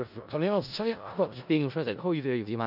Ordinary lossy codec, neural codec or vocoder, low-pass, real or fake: none; codec, 16 kHz in and 24 kHz out, 0.4 kbps, LongCat-Audio-Codec, four codebook decoder; 5.4 kHz; fake